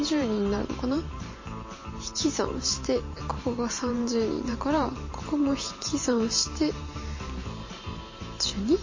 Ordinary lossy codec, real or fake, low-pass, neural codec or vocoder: MP3, 32 kbps; fake; 7.2 kHz; vocoder, 44.1 kHz, 128 mel bands every 512 samples, BigVGAN v2